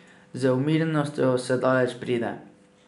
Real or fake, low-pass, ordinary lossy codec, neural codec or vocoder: real; 10.8 kHz; none; none